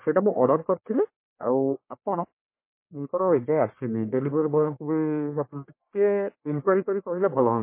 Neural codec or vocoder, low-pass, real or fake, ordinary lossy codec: codec, 44.1 kHz, 1.7 kbps, Pupu-Codec; 3.6 kHz; fake; MP3, 24 kbps